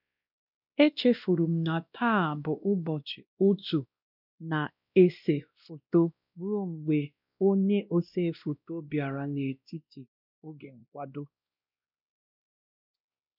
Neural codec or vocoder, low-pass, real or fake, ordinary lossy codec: codec, 16 kHz, 1 kbps, X-Codec, WavLM features, trained on Multilingual LibriSpeech; 5.4 kHz; fake; none